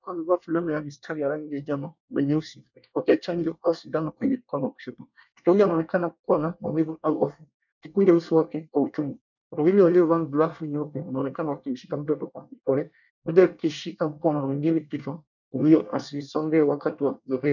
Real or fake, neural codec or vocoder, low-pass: fake; codec, 24 kHz, 1 kbps, SNAC; 7.2 kHz